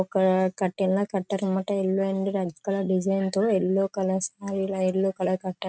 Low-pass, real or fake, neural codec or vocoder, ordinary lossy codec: none; real; none; none